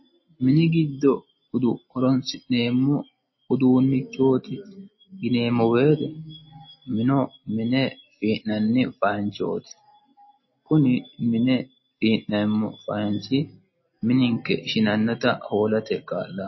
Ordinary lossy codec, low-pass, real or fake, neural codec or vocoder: MP3, 24 kbps; 7.2 kHz; real; none